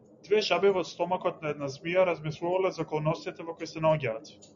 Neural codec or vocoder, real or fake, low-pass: none; real; 7.2 kHz